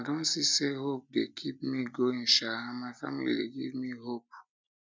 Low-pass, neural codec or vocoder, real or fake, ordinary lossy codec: none; none; real; none